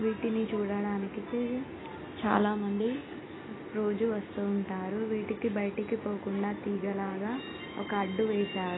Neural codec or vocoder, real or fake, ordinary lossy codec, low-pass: none; real; AAC, 16 kbps; 7.2 kHz